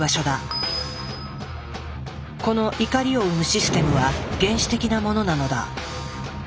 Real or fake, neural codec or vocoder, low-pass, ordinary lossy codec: real; none; none; none